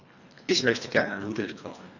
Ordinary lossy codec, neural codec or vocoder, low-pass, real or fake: none; codec, 24 kHz, 1.5 kbps, HILCodec; 7.2 kHz; fake